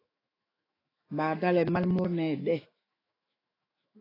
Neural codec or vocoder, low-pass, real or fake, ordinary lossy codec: autoencoder, 48 kHz, 128 numbers a frame, DAC-VAE, trained on Japanese speech; 5.4 kHz; fake; AAC, 24 kbps